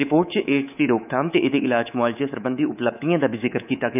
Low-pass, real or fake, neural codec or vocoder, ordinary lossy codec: 3.6 kHz; fake; codec, 24 kHz, 3.1 kbps, DualCodec; none